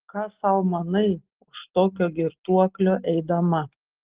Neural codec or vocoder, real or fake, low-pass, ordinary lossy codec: none; real; 3.6 kHz; Opus, 16 kbps